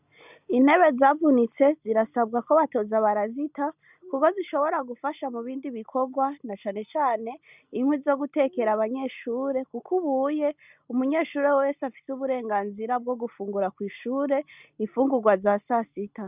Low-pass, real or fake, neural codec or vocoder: 3.6 kHz; real; none